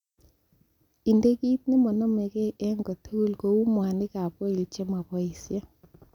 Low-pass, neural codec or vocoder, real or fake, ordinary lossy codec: 19.8 kHz; none; real; none